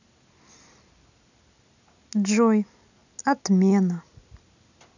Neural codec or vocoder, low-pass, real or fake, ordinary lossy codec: none; 7.2 kHz; real; none